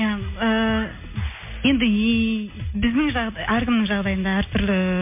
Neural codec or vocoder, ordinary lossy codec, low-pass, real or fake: none; MP3, 24 kbps; 3.6 kHz; real